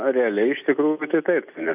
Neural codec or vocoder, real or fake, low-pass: none; real; 3.6 kHz